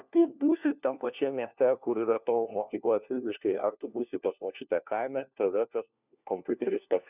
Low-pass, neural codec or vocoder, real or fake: 3.6 kHz; codec, 16 kHz, 1 kbps, FunCodec, trained on LibriTTS, 50 frames a second; fake